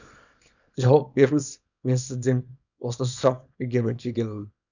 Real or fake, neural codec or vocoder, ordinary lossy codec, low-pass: fake; codec, 24 kHz, 0.9 kbps, WavTokenizer, small release; none; 7.2 kHz